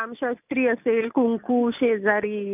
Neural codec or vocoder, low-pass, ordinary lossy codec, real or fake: none; 3.6 kHz; none; real